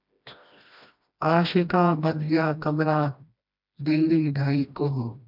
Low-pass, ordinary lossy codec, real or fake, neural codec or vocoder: 5.4 kHz; MP3, 48 kbps; fake; codec, 16 kHz, 1 kbps, FreqCodec, smaller model